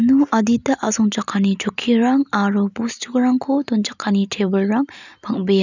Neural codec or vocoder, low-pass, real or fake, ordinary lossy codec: none; 7.2 kHz; real; none